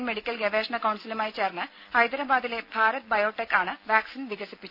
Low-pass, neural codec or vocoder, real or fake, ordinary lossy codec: 5.4 kHz; none; real; none